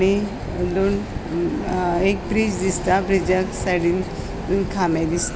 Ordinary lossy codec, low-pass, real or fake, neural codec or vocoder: none; none; real; none